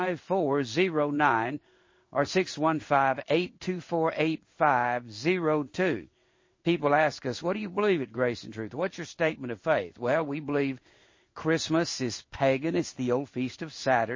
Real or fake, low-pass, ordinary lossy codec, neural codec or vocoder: fake; 7.2 kHz; MP3, 32 kbps; codec, 16 kHz in and 24 kHz out, 1 kbps, XY-Tokenizer